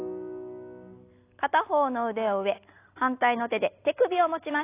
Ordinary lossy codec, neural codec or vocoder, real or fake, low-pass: AAC, 24 kbps; none; real; 3.6 kHz